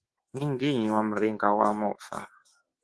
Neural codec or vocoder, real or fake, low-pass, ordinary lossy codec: codec, 24 kHz, 1.2 kbps, DualCodec; fake; 10.8 kHz; Opus, 16 kbps